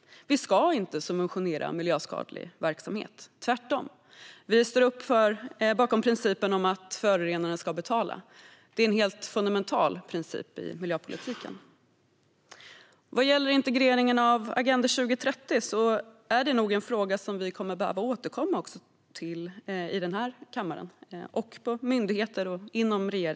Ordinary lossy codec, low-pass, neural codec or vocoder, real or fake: none; none; none; real